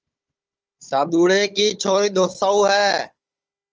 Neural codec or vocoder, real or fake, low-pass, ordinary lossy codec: codec, 16 kHz, 16 kbps, FunCodec, trained on Chinese and English, 50 frames a second; fake; 7.2 kHz; Opus, 24 kbps